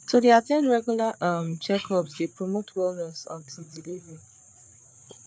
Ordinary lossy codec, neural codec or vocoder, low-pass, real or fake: none; codec, 16 kHz, 4 kbps, FreqCodec, larger model; none; fake